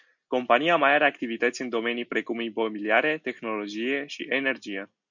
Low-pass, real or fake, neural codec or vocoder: 7.2 kHz; real; none